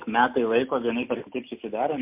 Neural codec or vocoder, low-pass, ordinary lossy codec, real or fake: none; 3.6 kHz; MP3, 32 kbps; real